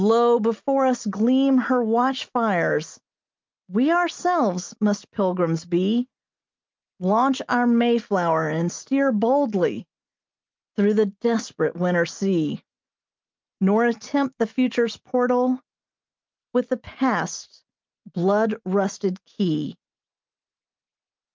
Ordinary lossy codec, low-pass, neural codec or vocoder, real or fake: Opus, 24 kbps; 7.2 kHz; none; real